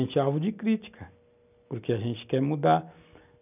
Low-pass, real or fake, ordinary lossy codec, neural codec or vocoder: 3.6 kHz; real; none; none